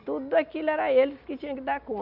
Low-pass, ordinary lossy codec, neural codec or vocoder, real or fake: 5.4 kHz; none; none; real